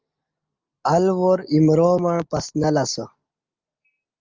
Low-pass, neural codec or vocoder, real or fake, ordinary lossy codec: 7.2 kHz; none; real; Opus, 24 kbps